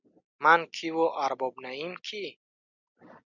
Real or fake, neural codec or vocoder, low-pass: real; none; 7.2 kHz